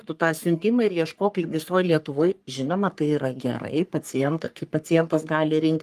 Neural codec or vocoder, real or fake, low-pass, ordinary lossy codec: codec, 44.1 kHz, 3.4 kbps, Pupu-Codec; fake; 14.4 kHz; Opus, 32 kbps